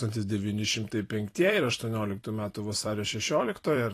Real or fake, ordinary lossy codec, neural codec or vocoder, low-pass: fake; AAC, 48 kbps; vocoder, 44.1 kHz, 128 mel bands every 256 samples, BigVGAN v2; 14.4 kHz